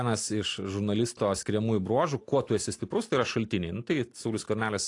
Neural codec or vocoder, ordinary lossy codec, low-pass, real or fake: none; AAC, 48 kbps; 10.8 kHz; real